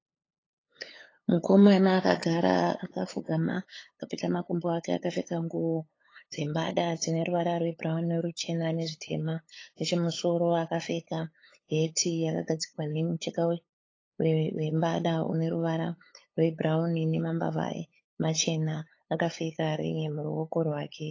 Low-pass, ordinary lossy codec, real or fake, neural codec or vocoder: 7.2 kHz; AAC, 32 kbps; fake; codec, 16 kHz, 8 kbps, FunCodec, trained on LibriTTS, 25 frames a second